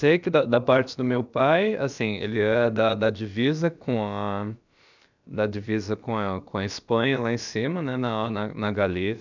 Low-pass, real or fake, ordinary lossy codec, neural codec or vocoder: 7.2 kHz; fake; none; codec, 16 kHz, about 1 kbps, DyCAST, with the encoder's durations